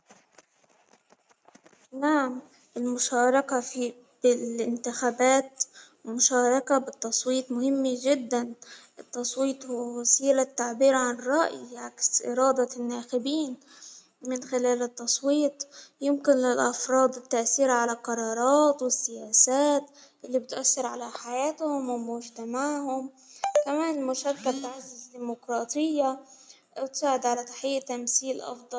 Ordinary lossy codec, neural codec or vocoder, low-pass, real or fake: none; none; none; real